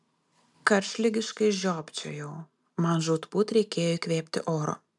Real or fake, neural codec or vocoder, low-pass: real; none; 10.8 kHz